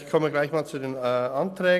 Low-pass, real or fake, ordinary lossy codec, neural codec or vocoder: 14.4 kHz; real; none; none